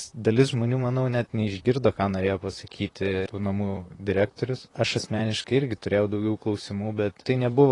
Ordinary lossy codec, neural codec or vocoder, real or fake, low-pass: AAC, 32 kbps; vocoder, 44.1 kHz, 128 mel bands, Pupu-Vocoder; fake; 10.8 kHz